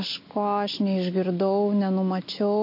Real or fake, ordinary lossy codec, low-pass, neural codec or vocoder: real; MP3, 32 kbps; 5.4 kHz; none